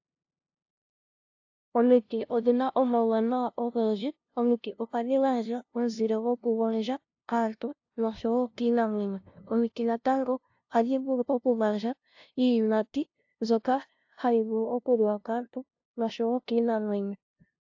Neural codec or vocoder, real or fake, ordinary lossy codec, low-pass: codec, 16 kHz, 0.5 kbps, FunCodec, trained on LibriTTS, 25 frames a second; fake; AAC, 48 kbps; 7.2 kHz